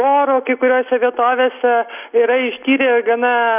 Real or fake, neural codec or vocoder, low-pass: real; none; 3.6 kHz